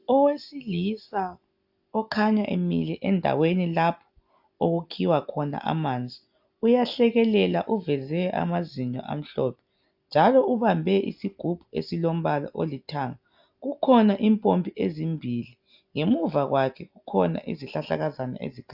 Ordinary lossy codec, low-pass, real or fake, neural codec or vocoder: AAC, 48 kbps; 5.4 kHz; real; none